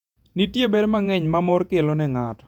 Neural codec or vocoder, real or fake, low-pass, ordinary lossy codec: vocoder, 48 kHz, 128 mel bands, Vocos; fake; 19.8 kHz; none